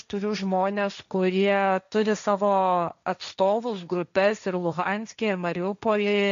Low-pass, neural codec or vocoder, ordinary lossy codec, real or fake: 7.2 kHz; codec, 16 kHz, 1.1 kbps, Voila-Tokenizer; MP3, 64 kbps; fake